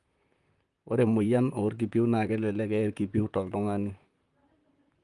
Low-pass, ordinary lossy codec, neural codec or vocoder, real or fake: 10.8 kHz; Opus, 32 kbps; vocoder, 44.1 kHz, 128 mel bands, Pupu-Vocoder; fake